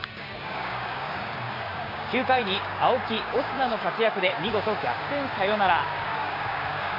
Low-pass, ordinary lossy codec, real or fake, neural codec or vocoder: 5.4 kHz; AAC, 32 kbps; fake; codec, 16 kHz, 6 kbps, DAC